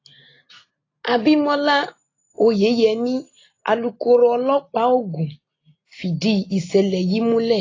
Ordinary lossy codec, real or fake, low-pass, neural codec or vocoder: AAC, 32 kbps; real; 7.2 kHz; none